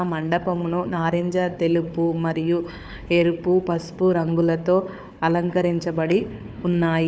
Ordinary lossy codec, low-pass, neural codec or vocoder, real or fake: none; none; codec, 16 kHz, 4 kbps, FunCodec, trained on Chinese and English, 50 frames a second; fake